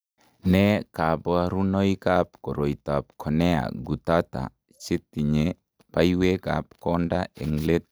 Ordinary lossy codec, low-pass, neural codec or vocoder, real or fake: none; none; none; real